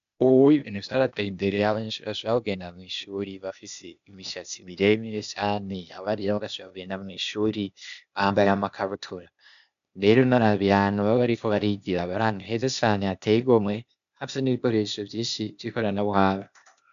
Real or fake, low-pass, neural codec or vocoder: fake; 7.2 kHz; codec, 16 kHz, 0.8 kbps, ZipCodec